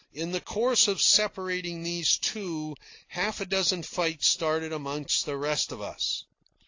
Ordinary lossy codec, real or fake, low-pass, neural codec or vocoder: AAC, 48 kbps; real; 7.2 kHz; none